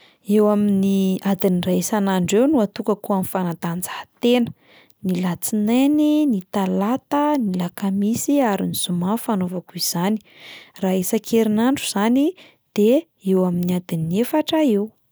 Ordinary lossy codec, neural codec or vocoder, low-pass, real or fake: none; none; none; real